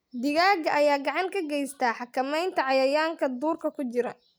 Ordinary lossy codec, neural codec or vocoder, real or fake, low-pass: none; none; real; none